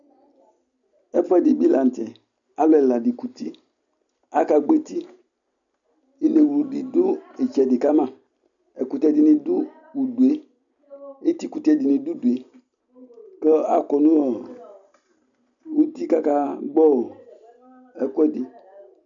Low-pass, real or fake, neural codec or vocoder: 7.2 kHz; real; none